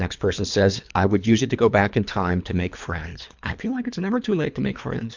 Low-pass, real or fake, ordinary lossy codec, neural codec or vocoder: 7.2 kHz; fake; MP3, 64 kbps; codec, 24 kHz, 3 kbps, HILCodec